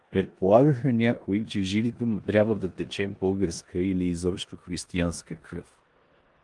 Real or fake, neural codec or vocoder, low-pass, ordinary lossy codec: fake; codec, 16 kHz in and 24 kHz out, 0.9 kbps, LongCat-Audio-Codec, four codebook decoder; 10.8 kHz; Opus, 24 kbps